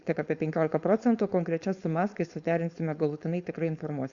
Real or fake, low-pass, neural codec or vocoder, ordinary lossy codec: fake; 7.2 kHz; codec, 16 kHz, 4.8 kbps, FACodec; Opus, 64 kbps